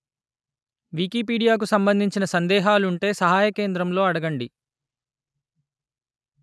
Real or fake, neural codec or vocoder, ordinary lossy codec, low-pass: real; none; none; none